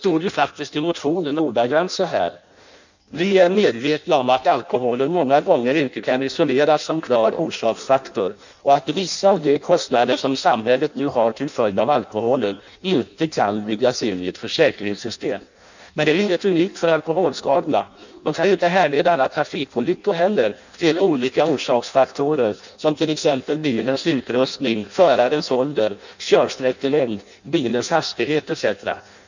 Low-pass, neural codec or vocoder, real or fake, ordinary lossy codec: 7.2 kHz; codec, 16 kHz in and 24 kHz out, 0.6 kbps, FireRedTTS-2 codec; fake; none